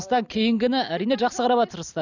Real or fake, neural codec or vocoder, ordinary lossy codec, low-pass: real; none; none; 7.2 kHz